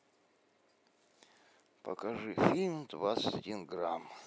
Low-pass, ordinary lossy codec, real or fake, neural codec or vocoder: none; none; real; none